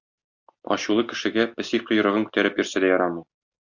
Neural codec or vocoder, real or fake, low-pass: none; real; 7.2 kHz